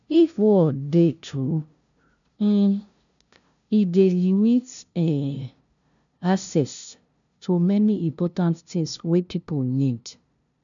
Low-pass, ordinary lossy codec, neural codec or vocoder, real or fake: 7.2 kHz; none; codec, 16 kHz, 0.5 kbps, FunCodec, trained on LibriTTS, 25 frames a second; fake